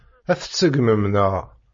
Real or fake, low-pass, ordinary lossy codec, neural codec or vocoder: real; 7.2 kHz; MP3, 32 kbps; none